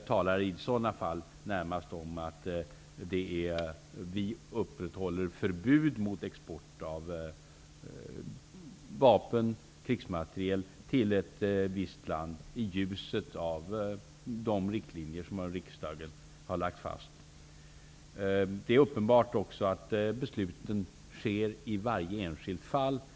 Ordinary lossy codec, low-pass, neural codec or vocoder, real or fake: none; none; none; real